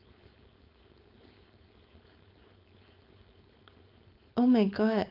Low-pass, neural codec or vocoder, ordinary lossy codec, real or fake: 5.4 kHz; codec, 16 kHz, 4.8 kbps, FACodec; none; fake